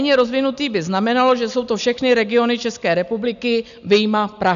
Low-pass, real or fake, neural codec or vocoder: 7.2 kHz; real; none